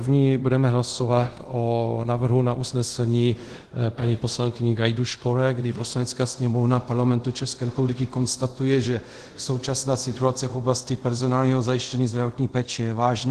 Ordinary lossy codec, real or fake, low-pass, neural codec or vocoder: Opus, 16 kbps; fake; 10.8 kHz; codec, 24 kHz, 0.5 kbps, DualCodec